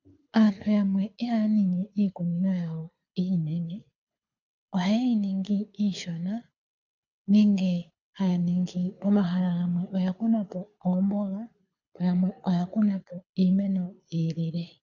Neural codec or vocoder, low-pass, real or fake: codec, 24 kHz, 6 kbps, HILCodec; 7.2 kHz; fake